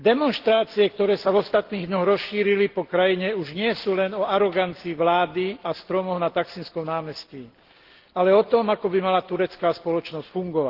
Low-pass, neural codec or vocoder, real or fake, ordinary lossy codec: 5.4 kHz; none; real; Opus, 16 kbps